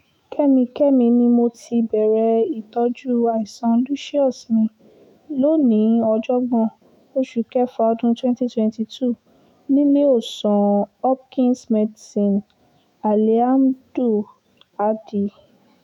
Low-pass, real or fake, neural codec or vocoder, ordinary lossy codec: 19.8 kHz; fake; autoencoder, 48 kHz, 128 numbers a frame, DAC-VAE, trained on Japanese speech; none